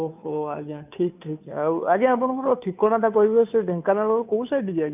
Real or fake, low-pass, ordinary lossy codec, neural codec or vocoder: fake; 3.6 kHz; none; codec, 16 kHz, 2 kbps, FunCodec, trained on Chinese and English, 25 frames a second